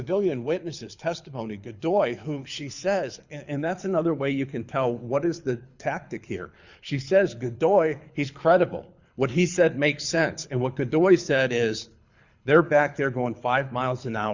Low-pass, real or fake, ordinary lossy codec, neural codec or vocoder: 7.2 kHz; fake; Opus, 64 kbps; codec, 24 kHz, 6 kbps, HILCodec